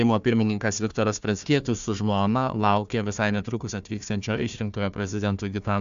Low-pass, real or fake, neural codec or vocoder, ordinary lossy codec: 7.2 kHz; fake; codec, 16 kHz, 1 kbps, FunCodec, trained on Chinese and English, 50 frames a second; AAC, 96 kbps